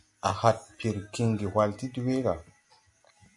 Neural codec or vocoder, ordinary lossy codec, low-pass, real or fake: none; MP3, 96 kbps; 10.8 kHz; real